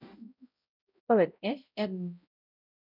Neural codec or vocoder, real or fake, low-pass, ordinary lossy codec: codec, 16 kHz, 0.5 kbps, X-Codec, HuBERT features, trained on balanced general audio; fake; 5.4 kHz; none